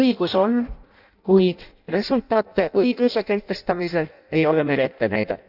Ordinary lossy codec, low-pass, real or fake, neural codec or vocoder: none; 5.4 kHz; fake; codec, 16 kHz in and 24 kHz out, 0.6 kbps, FireRedTTS-2 codec